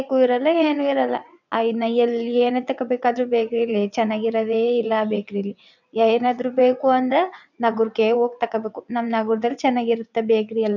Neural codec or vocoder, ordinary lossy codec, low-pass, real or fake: vocoder, 22.05 kHz, 80 mel bands, WaveNeXt; none; 7.2 kHz; fake